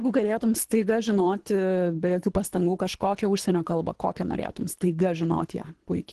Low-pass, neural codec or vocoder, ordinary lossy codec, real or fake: 10.8 kHz; codec, 24 kHz, 3 kbps, HILCodec; Opus, 16 kbps; fake